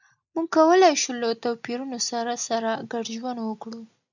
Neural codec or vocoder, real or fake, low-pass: none; real; 7.2 kHz